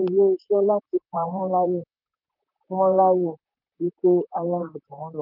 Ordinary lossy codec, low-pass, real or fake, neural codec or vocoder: none; 5.4 kHz; fake; vocoder, 44.1 kHz, 128 mel bands, Pupu-Vocoder